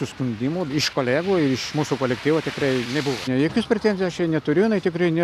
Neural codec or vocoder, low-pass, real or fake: none; 14.4 kHz; real